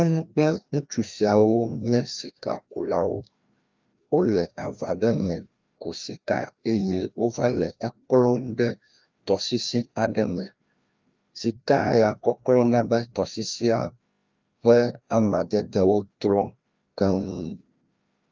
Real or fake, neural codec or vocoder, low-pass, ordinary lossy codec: fake; codec, 16 kHz, 1 kbps, FreqCodec, larger model; 7.2 kHz; Opus, 24 kbps